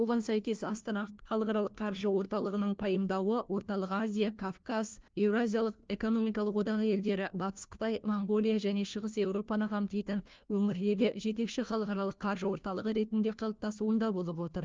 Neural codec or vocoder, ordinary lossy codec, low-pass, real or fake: codec, 16 kHz, 1 kbps, FunCodec, trained on LibriTTS, 50 frames a second; Opus, 24 kbps; 7.2 kHz; fake